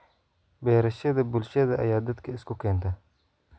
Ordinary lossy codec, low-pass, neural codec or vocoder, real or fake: none; none; none; real